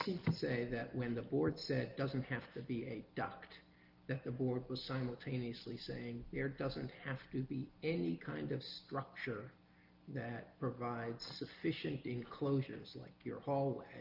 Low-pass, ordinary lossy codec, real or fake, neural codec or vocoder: 5.4 kHz; Opus, 32 kbps; real; none